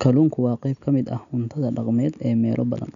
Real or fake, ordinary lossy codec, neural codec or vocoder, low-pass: real; none; none; 7.2 kHz